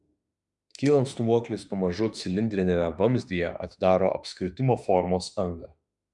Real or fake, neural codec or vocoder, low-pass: fake; autoencoder, 48 kHz, 32 numbers a frame, DAC-VAE, trained on Japanese speech; 10.8 kHz